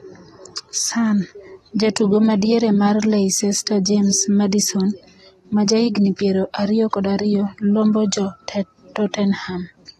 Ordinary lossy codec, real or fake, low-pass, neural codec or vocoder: AAC, 32 kbps; real; 19.8 kHz; none